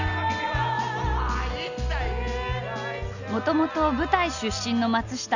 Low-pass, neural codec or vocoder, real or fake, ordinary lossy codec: 7.2 kHz; none; real; none